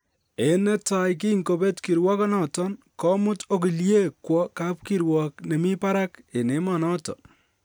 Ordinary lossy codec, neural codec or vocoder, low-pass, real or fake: none; none; none; real